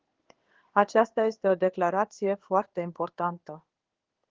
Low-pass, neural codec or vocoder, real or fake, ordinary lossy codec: 7.2 kHz; codec, 16 kHz in and 24 kHz out, 1 kbps, XY-Tokenizer; fake; Opus, 16 kbps